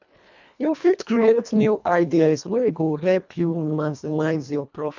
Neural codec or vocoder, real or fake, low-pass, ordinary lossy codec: codec, 24 kHz, 1.5 kbps, HILCodec; fake; 7.2 kHz; none